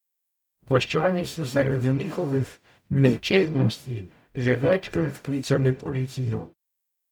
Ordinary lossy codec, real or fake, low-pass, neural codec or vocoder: none; fake; 19.8 kHz; codec, 44.1 kHz, 0.9 kbps, DAC